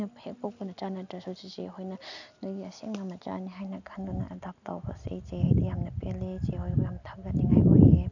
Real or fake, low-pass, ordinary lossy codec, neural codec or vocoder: real; 7.2 kHz; none; none